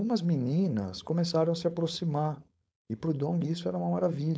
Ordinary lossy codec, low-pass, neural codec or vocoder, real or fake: none; none; codec, 16 kHz, 4.8 kbps, FACodec; fake